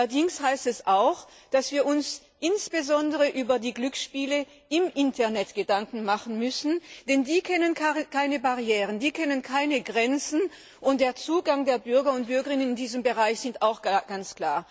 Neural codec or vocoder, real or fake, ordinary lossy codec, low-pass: none; real; none; none